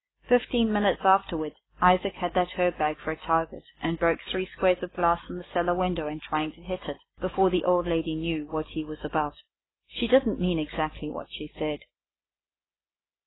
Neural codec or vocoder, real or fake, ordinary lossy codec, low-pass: none; real; AAC, 16 kbps; 7.2 kHz